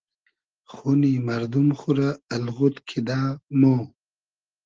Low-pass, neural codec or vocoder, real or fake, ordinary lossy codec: 7.2 kHz; none; real; Opus, 16 kbps